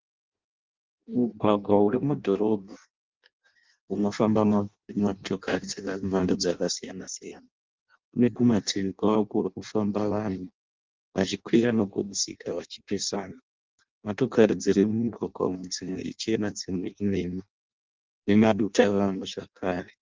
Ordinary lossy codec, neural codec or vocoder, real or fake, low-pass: Opus, 32 kbps; codec, 16 kHz in and 24 kHz out, 0.6 kbps, FireRedTTS-2 codec; fake; 7.2 kHz